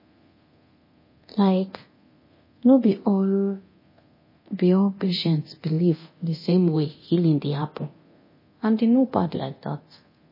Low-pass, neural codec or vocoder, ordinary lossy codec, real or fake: 5.4 kHz; codec, 24 kHz, 0.9 kbps, DualCodec; MP3, 24 kbps; fake